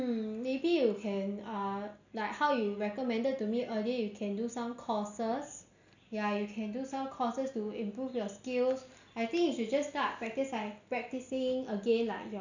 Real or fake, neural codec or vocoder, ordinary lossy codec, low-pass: real; none; none; 7.2 kHz